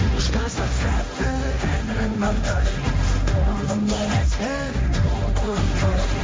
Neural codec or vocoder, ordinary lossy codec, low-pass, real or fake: codec, 16 kHz, 1.1 kbps, Voila-Tokenizer; none; none; fake